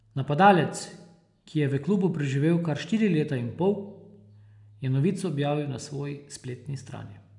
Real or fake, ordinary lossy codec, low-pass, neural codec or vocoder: real; none; 10.8 kHz; none